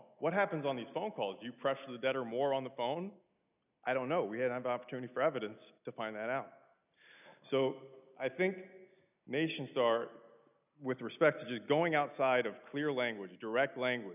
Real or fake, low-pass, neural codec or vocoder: real; 3.6 kHz; none